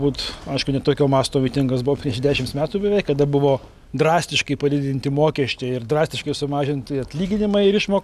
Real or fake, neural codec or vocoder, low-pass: real; none; 14.4 kHz